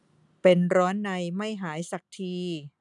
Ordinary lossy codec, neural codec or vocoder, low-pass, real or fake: none; none; 10.8 kHz; real